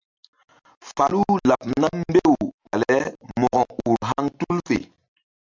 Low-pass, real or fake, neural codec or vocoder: 7.2 kHz; real; none